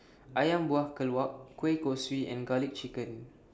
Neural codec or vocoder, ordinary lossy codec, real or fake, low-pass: none; none; real; none